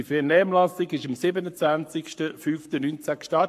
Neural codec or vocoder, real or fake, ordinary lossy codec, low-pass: codec, 44.1 kHz, 7.8 kbps, Pupu-Codec; fake; AAC, 64 kbps; 14.4 kHz